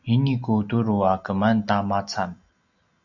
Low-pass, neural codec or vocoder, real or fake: 7.2 kHz; none; real